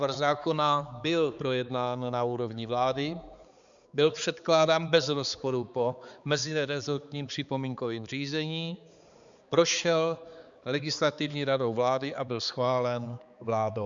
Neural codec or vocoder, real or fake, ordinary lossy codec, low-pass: codec, 16 kHz, 4 kbps, X-Codec, HuBERT features, trained on balanced general audio; fake; Opus, 64 kbps; 7.2 kHz